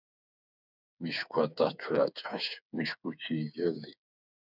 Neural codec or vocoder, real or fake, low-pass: codec, 16 kHz, 4 kbps, FreqCodec, smaller model; fake; 5.4 kHz